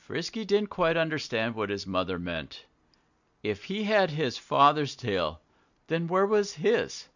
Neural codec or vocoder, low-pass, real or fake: none; 7.2 kHz; real